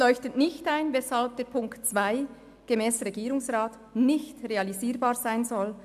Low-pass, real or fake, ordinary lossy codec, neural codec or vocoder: 14.4 kHz; real; AAC, 96 kbps; none